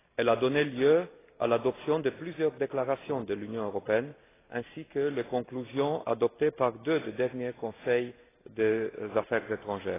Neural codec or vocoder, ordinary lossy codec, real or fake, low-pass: none; AAC, 16 kbps; real; 3.6 kHz